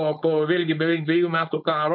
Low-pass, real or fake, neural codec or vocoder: 5.4 kHz; fake; codec, 16 kHz, 4.8 kbps, FACodec